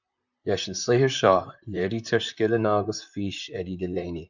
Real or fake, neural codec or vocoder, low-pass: fake; vocoder, 44.1 kHz, 128 mel bands, Pupu-Vocoder; 7.2 kHz